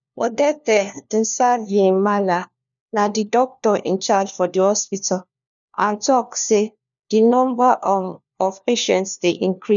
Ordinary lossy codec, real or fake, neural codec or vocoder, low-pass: none; fake; codec, 16 kHz, 1 kbps, FunCodec, trained on LibriTTS, 50 frames a second; 7.2 kHz